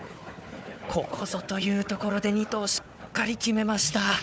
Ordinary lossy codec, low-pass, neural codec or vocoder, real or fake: none; none; codec, 16 kHz, 4 kbps, FunCodec, trained on Chinese and English, 50 frames a second; fake